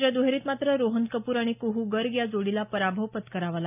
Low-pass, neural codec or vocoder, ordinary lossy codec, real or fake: 3.6 kHz; none; none; real